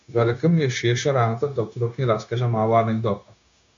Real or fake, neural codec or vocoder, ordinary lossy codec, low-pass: fake; codec, 16 kHz, 0.9 kbps, LongCat-Audio-Codec; AAC, 64 kbps; 7.2 kHz